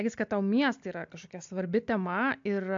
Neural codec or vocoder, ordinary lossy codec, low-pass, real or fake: none; AAC, 64 kbps; 7.2 kHz; real